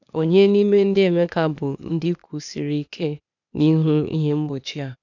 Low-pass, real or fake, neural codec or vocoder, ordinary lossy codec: 7.2 kHz; fake; codec, 16 kHz, 0.8 kbps, ZipCodec; none